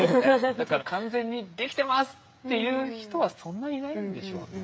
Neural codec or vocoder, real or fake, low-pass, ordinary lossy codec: codec, 16 kHz, 16 kbps, FreqCodec, smaller model; fake; none; none